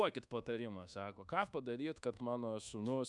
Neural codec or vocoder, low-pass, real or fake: codec, 24 kHz, 1.2 kbps, DualCodec; 10.8 kHz; fake